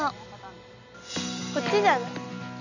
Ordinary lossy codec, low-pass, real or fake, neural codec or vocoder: none; 7.2 kHz; real; none